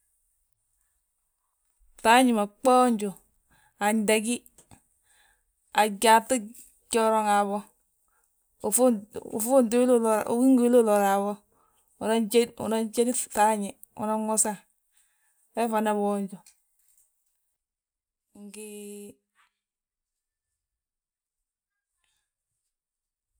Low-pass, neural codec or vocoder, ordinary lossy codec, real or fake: none; none; none; real